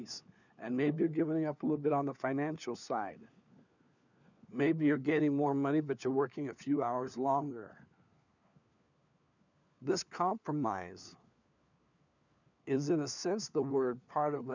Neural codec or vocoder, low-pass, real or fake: codec, 16 kHz, 4 kbps, FunCodec, trained on LibriTTS, 50 frames a second; 7.2 kHz; fake